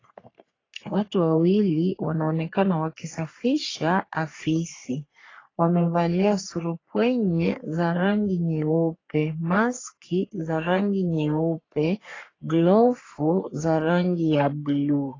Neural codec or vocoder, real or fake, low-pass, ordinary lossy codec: codec, 44.1 kHz, 3.4 kbps, Pupu-Codec; fake; 7.2 kHz; AAC, 32 kbps